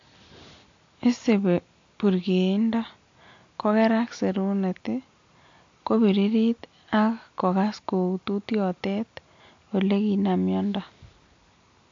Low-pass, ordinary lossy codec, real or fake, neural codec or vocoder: 7.2 kHz; AAC, 48 kbps; real; none